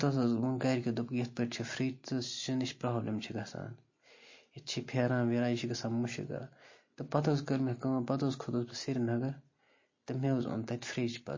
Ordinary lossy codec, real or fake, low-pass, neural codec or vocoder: MP3, 32 kbps; real; 7.2 kHz; none